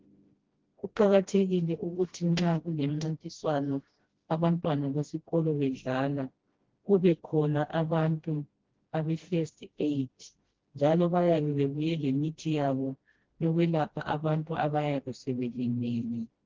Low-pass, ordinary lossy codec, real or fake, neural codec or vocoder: 7.2 kHz; Opus, 16 kbps; fake; codec, 16 kHz, 1 kbps, FreqCodec, smaller model